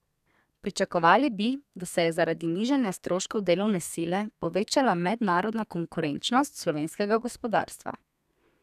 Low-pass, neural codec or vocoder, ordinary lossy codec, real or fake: 14.4 kHz; codec, 32 kHz, 1.9 kbps, SNAC; none; fake